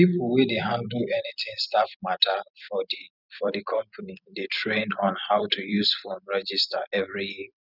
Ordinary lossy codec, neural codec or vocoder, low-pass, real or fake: none; vocoder, 44.1 kHz, 128 mel bands every 256 samples, BigVGAN v2; 5.4 kHz; fake